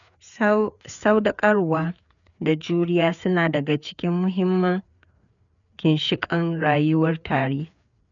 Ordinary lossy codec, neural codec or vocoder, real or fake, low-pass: none; codec, 16 kHz, 4 kbps, FreqCodec, larger model; fake; 7.2 kHz